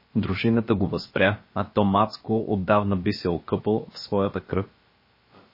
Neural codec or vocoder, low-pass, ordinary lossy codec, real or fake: codec, 16 kHz, about 1 kbps, DyCAST, with the encoder's durations; 5.4 kHz; MP3, 24 kbps; fake